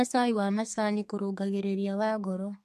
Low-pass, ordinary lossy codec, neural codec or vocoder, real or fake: 14.4 kHz; MP3, 64 kbps; codec, 32 kHz, 1.9 kbps, SNAC; fake